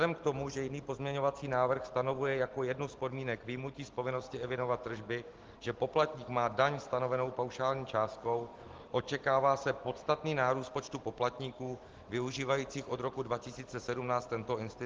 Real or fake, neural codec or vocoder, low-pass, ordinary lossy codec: real; none; 7.2 kHz; Opus, 16 kbps